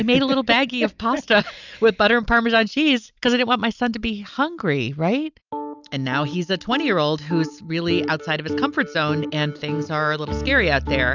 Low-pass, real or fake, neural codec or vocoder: 7.2 kHz; real; none